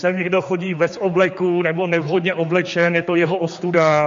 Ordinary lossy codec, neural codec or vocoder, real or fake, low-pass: MP3, 48 kbps; codec, 16 kHz, 4 kbps, X-Codec, HuBERT features, trained on general audio; fake; 7.2 kHz